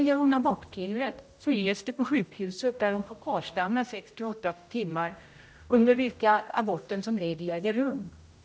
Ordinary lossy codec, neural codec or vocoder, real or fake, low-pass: none; codec, 16 kHz, 0.5 kbps, X-Codec, HuBERT features, trained on general audio; fake; none